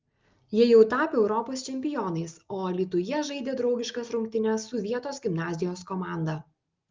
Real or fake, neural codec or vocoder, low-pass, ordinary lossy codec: real; none; 7.2 kHz; Opus, 32 kbps